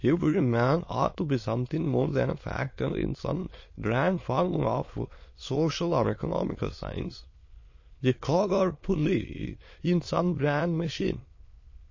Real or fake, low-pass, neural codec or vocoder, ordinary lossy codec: fake; 7.2 kHz; autoencoder, 22.05 kHz, a latent of 192 numbers a frame, VITS, trained on many speakers; MP3, 32 kbps